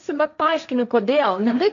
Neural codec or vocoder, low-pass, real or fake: codec, 16 kHz, 1.1 kbps, Voila-Tokenizer; 7.2 kHz; fake